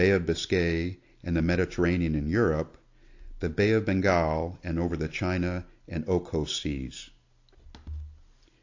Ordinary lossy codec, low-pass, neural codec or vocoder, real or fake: AAC, 48 kbps; 7.2 kHz; none; real